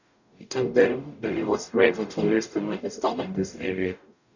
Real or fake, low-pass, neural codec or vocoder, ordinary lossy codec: fake; 7.2 kHz; codec, 44.1 kHz, 0.9 kbps, DAC; none